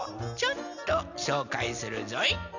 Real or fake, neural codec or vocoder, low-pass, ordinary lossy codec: real; none; 7.2 kHz; none